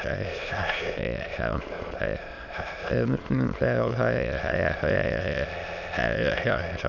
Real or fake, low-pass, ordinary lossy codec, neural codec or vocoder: fake; 7.2 kHz; none; autoencoder, 22.05 kHz, a latent of 192 numbers a frame, VITS, trained on many speakers